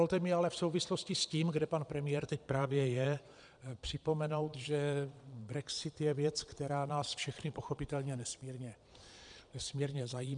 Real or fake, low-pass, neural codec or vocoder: fake; 9.9 kHz; vocoder, 22.05 kHz, 80 mel bands, Vocos